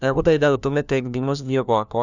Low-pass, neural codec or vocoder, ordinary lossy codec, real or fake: 7.2 kHz; codec, 16 kHz, 1 kbps, FunCodec, trained on LibriTTS, 50 frames a second; none; fake